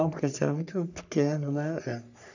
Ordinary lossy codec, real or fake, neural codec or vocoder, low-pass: none; fake; codec, 44.1 kHz, 3.4 kbps, Pupu-Codec; 7.2 kHz